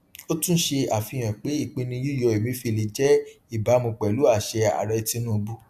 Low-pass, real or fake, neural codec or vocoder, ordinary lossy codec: 14.4 kHz; real; none; AAC, 96 kbps